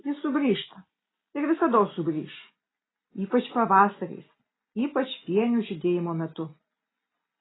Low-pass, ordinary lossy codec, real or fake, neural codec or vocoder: 7.2 kHz; AAC, 16 kbps; real; none